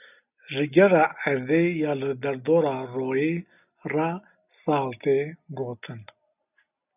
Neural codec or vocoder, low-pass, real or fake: none; 3.6 kHz; real